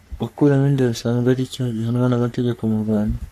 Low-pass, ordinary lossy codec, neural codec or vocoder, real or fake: 14.4 kHz; AAC, 64 kbps; codec, 44.1 kHz, 3.4 kbps, Pupu-Codec; fake